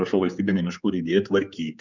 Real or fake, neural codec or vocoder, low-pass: fake; codec, 16 kHz, 4 kbps, X-Codec, HuBERT features, trained on general audio; 7.2 kHz